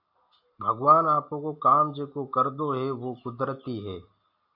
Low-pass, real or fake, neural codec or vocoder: 5.4 kHz; real; none